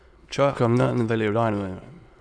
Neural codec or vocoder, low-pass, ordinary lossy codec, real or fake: autoencoder, 22.05 kHz, a latent of 192 numbers a frame, VITS, trained on many speakers; none; none; fake